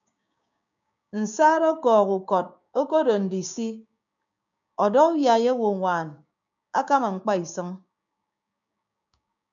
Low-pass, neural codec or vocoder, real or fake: 7.2 kHz; codec, 16 kHz, 6 kbps, DAC; fake